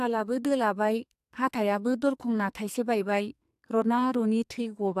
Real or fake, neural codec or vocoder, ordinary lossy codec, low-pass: fake; codec, 44.1 kHz, 2.6 kbps, SNAC; AAC, 96 kbps; 14.4 kHz